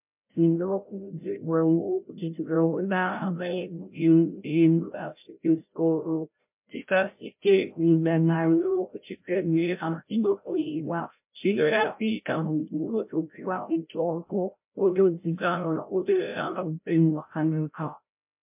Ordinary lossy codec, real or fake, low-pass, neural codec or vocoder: MP3, 32 kbps; fake; 3.6 kHz; codec, 16 kHz, 0.5 kbps, FreqCodec, larger model